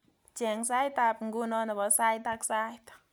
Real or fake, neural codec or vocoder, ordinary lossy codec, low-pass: real; none; none; none